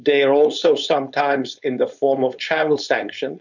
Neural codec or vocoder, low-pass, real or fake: codec, 16 kHz, 4.8 kbps, FACodec; 7.2 kHz; fake